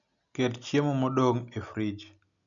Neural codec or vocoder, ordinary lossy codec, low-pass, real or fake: none; none; 7.2 kHz; real